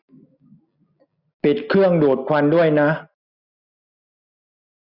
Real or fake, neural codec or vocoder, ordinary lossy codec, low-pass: real; none; none; 5.4 kHz